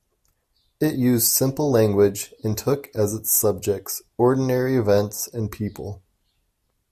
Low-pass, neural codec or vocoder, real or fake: 14.4 kHz; none; real